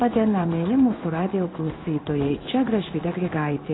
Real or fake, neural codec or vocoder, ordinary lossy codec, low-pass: fake; codec, 16 kHz in and 24 kHz out, 1 kbps, XY-Tokenizer; AAC, 16 kbps; 7.2 kHz